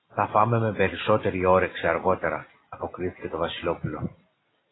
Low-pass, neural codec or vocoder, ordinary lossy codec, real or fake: 7.2 kHz; none; AAC, 16 kbps; real